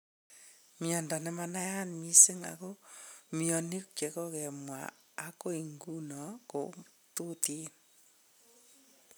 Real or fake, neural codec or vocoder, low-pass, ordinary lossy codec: real; none; none; none